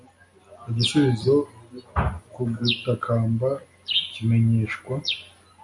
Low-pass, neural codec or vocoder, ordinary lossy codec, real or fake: 10.8 kHz; none; MP3, 64 kbps; real